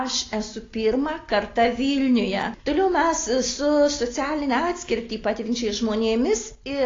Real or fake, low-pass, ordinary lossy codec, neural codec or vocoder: real; 7.2 kHz; AAC, 32 kbps; none